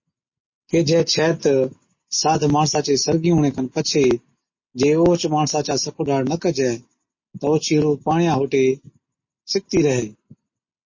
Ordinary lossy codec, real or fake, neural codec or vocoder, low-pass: MP3, 32 kbps; real; none; 7.2 kHz